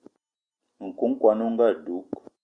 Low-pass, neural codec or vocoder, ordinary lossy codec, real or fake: 9.9 kHz; none; Opus, 64 kbps; real